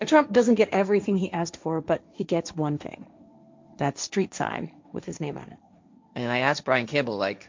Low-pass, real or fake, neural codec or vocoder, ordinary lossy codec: 7.2 kHz; fake; codec, 16 kHz, 1.1 kbps, Voila-Tokenizer; MP3, 64 kbps